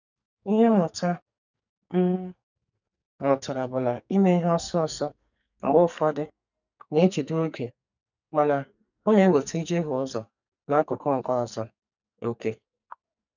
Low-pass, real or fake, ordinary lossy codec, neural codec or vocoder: 7.2 kHz; fake; none; codec, 44.1 kHz, 2.6 kbps, SNAC